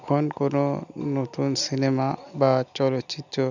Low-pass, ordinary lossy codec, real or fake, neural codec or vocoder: 7.2 kHz; none; real; none